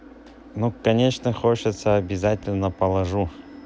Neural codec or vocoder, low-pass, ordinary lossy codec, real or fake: none; none; none; real